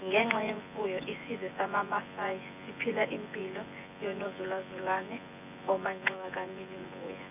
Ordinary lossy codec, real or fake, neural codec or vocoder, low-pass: none; fake; vocoder, 24 kHz, 100 mel bands, Vocos; 3.6 kHz